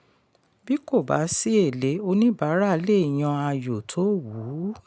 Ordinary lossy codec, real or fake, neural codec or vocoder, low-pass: none; real; none; none